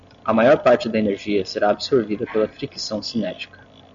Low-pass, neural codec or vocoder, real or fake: 7.2 kHz; none; real